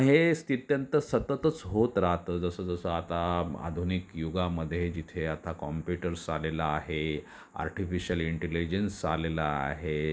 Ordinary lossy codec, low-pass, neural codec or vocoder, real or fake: none; none; none; real